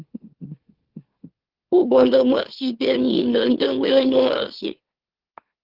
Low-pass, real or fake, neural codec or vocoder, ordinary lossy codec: 5.4 kHz; fake; autoencoder, 44.1 kHz, a latent of 192 numbers a frame, MeloTTS; Opus, 16 kbps